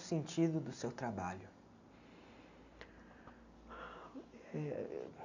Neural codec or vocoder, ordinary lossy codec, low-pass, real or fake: none; none; 7.2 kHz; real